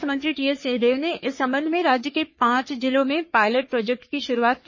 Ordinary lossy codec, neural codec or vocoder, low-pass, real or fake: MP3, 32 kbps; codec, 44.1 kHz, 3.4 kbps, Pupu-Codec; 7.2 kHz; fake